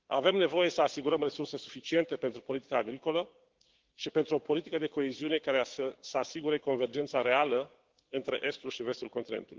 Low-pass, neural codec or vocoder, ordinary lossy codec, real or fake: 7.2 kHz; codec, 16 kHz, 6 kbps, DAC; Opus, 16 kbps; fake